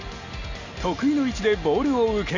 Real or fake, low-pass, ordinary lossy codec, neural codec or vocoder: real; 7.2 kHz; Opus, 64 kbps; none